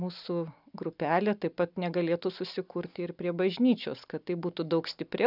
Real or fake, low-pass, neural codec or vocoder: real; 5.4 kHz; none